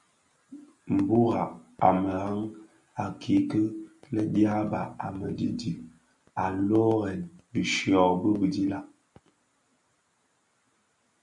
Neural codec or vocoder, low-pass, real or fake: none; 10.8 kHz; real